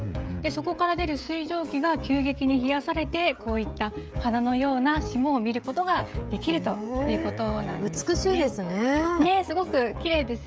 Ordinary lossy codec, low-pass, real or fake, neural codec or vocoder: none; none; fake; codec, 16 kHz, 16 kbps, FreqCodec, smaller model